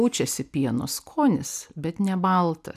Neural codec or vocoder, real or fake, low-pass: none; real; 14.4 kHz